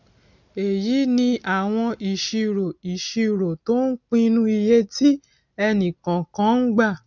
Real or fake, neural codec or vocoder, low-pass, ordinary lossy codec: real; none; 7.2 kHz; none